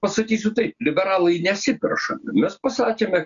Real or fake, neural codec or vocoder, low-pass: real; none; 7.2 kHz